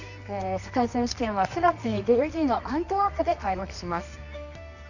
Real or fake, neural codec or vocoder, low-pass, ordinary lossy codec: fake; codec, 24 kHz, 0.9 kbps, WavTokenizer, medium music audio release; 7.2 kHz; none